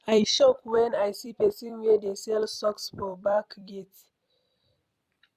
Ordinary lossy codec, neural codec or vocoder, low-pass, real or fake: MP3, 96 kbps; vocoder, 44.1 kHz, 128 mel bands every 256 samples, BigVGAN v2; 14.4 kHz; fake